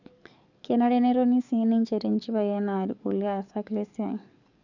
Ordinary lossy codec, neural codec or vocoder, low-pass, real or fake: none; codec, 44.1 kHz, 7.8 kbps, DAC; 7.2 kHz; fake